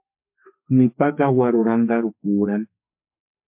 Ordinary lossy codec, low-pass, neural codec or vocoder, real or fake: MP3, 32 kbps; 3.6 kHz; codec, 44.1 kHz, 2.6 kbps, SNAC; fake